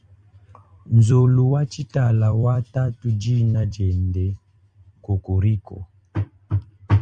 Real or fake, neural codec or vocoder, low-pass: fake; vocoder, 44.1 kHz, 128 mel bands every 256 samples, BigVGAN v2; 9.9 kHz